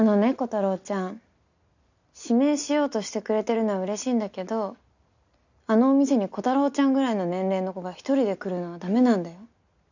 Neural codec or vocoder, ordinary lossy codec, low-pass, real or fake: none; none; 7.2 kHz; real